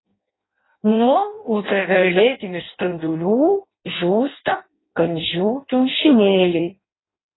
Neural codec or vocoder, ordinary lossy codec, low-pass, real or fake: codec, 16 kHz in and 24 kHz out, 0.6 kbps, FireRedTTS-2 codec; AAC, 16 kbps; 7.2 kHz; fake